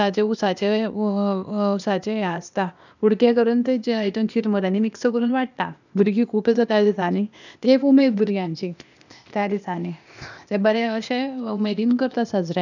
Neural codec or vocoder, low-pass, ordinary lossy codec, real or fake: codec, 16 kHz, 0.8 kbps, ZipCodec; 7.2 kHz; none; fake